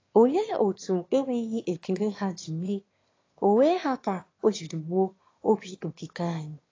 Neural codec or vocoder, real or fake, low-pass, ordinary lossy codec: autoencoder, 22.05 kHz, a latent of 192 numbers a frame, VITS, trained on one speaker; fake; 7.2 kHz; AAC, 32 kbps